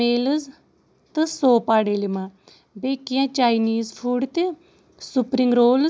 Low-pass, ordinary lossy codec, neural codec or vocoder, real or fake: none; none; none; real